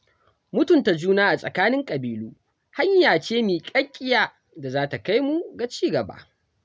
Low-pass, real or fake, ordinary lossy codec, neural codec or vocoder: none; real; none; none